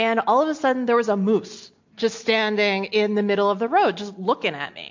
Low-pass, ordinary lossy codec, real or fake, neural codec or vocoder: 7.2 kHz; MP3, 48 kbps; real; none